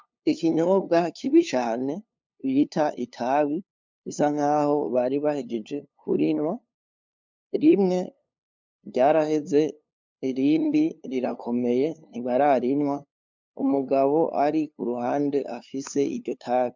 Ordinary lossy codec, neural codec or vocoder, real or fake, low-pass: MP3, 64 kbps; codec, 16 kHz, 2 kbps, FunCodec, trained on LibriTTS, 25 frames a second; fake; 7.2 kHz